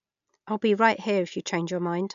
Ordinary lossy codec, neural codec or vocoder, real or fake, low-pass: none; none; real; 7.2 kHz